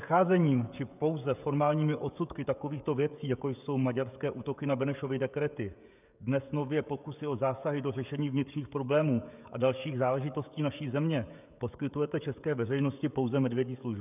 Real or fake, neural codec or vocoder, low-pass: fake; codec, 16 kHz, 16 kbps, FreqCodec, smaller model; 3.6 kHz